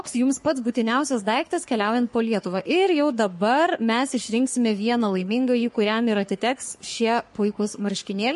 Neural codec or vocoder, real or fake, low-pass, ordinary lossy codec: codec, 44.1 kHz, 3.4 kbps, Pupu-Codec; fake; 14.4 kHz; MP3, 48 kbps